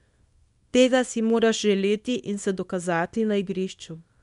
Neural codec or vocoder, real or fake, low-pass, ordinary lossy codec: codec, 24 kHz, 0.9 kbps, WavTokenizer, small release; fake; 10.8 kHz; MP3, 96 kbps